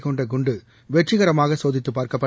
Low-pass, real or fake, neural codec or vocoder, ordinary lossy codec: none; real; none; none